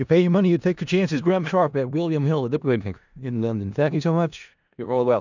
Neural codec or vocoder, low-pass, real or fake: codec, 16 kHz in and 24 kHz out, 0.4 kbps, LongCat-Audio-Codec, four codebook decoder; 7.2 kHz; fake